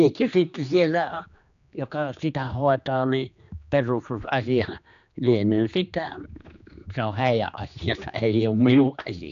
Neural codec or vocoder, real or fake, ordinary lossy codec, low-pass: codec, 16 kHz, 2 kbps, X-Codec, HuBERT features, trained on general audio; fake; AAC, 96 kbps; 7.2 kHz